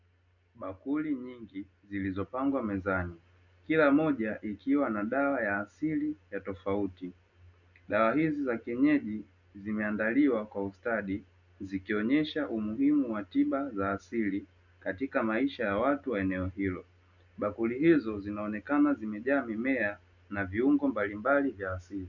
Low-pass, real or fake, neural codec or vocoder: 7.2 kHz; real; none